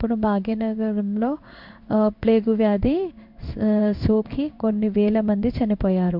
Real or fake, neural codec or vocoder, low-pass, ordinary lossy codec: fake; codec, 16 kHz in and 24 kHz out, 1 kbps, XY-Tokenizer; 5.4 kHz; MP3, 48 kbps